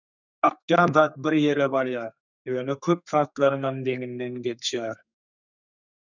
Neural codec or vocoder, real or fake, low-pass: codec, 32 kHz, 1.9 kbps, SNAC; fake; 7.2 kHz